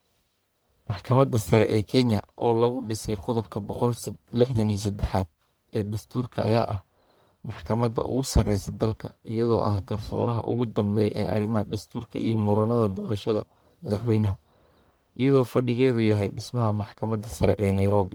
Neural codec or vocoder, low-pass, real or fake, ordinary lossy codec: codec, 44.1 kHz, 1.7 kbps, Pupu-Codec; none; fake; none